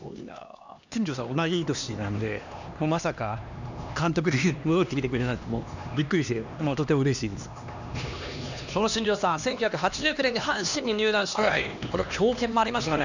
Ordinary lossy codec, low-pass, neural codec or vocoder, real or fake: none; 7.2 kHz; codec, 16 kHz, 2 kbps, X-Codec, HuBERT features, trained on LibriSpeech; fake